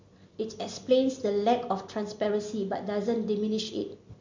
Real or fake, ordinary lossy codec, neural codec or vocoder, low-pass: real; MP3, 48 kbps; none; 7.2 kHz